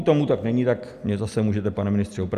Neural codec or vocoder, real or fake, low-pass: none; real; 14.4 kHz